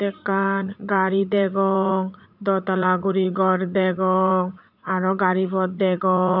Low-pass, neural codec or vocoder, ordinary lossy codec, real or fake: 5.4 kHz; vocoder, 44.1 kHz, 80 mel bands, Vocos; none; fake